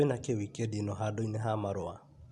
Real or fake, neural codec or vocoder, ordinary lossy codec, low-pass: real; none; none; none